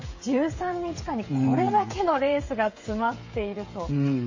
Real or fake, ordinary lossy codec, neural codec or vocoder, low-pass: fake; MP3, 32 kbps; codec, 16 kHz, 8 kbps, FreqCodec, smaller model; 7.2 kHz